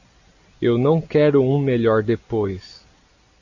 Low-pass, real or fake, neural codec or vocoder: 7.2 kHz; real; none